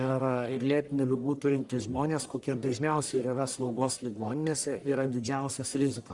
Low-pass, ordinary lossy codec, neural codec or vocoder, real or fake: 10.8 kHz; Opus, 64 kbps; codec, 44.1 kHz, 1.7 kbps, Pupu-Codec; fake